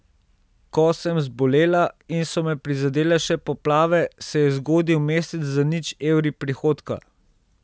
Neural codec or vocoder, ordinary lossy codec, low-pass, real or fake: none; none; none; real